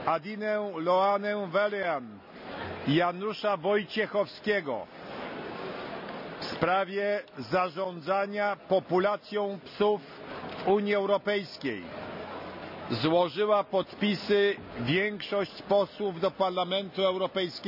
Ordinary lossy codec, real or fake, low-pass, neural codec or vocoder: none; real; 5.4 kHz; none